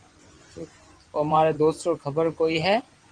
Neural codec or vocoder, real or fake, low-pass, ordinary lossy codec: vocoder, 24 kHz, 100 mel bands, Vocos; fake; 9.9 kHz; Opus, 24 kbps